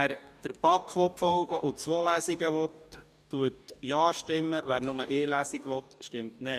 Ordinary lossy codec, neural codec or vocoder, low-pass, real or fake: none; codec, 44.1 kHz, 2.6 kbps, DAC; 14.4 kHz; fake